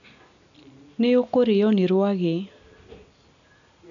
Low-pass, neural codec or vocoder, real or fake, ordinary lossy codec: 7.2 kHz; none; real; none